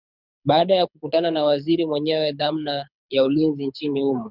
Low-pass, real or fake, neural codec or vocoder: 5.4 kHz; fake; codec, 24 kHz, 6 kbps, HILCodec